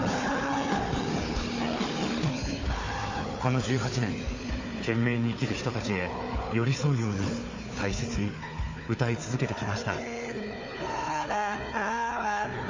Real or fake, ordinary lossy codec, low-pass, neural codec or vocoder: fake; MP3, 32 kbps; 7.2 kHz; codec, 16 kHz, 4 kbps, FunCodec, trained on Chinese and English, 50 frames a second